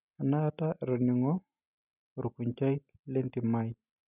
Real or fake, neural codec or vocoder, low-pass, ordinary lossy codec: real; none; 3.6 kHz; none